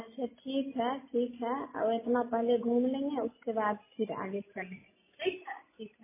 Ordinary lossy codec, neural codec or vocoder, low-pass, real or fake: MP3, 16 kbps; none; 3.6 kHz; real